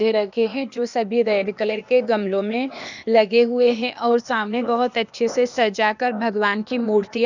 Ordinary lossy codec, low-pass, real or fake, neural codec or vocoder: none; 7.2 kHz; fake; codec, 16 kHz, 0.8 kbps, ZipCodec